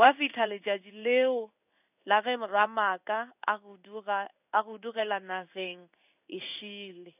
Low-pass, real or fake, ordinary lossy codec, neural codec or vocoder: 3.6 kHz; fake; none; codec, 16 kHz in and 24 kHz out, 1 kbps, XY-Tokenizer